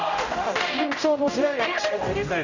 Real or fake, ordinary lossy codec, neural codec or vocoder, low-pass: fake; none; codec, 16 kHz, 0.5 kbps, X-Codec, HuBERT features, trained on general audio; 7.2 kHz